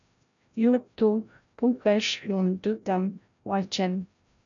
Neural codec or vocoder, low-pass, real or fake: codec, 16 kHz, 0.5 kbps, FreqCodec, larger model; 7.2 kHz; fake